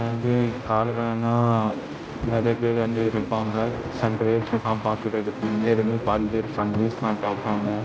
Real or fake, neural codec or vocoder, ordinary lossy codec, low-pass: fake; codec, 16 kHz, 0.5 kbps, X-Codec, HuBERT features, trained on general audio; none; none